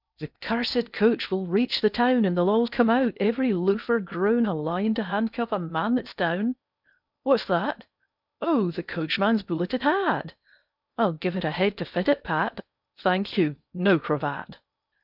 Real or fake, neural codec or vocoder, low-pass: fake; codec, 16 kHz in and 24 kHz out, 0.8 kbps, FocalCodec, streaming, 65536 codes; 5.4 kHz